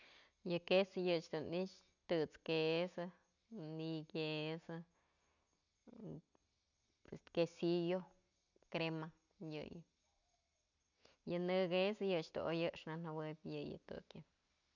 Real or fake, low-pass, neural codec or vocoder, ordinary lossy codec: real; 7.2 kHz; none; none